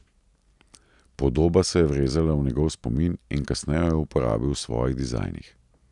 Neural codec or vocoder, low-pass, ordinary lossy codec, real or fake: none; 10.8 kHz; none; real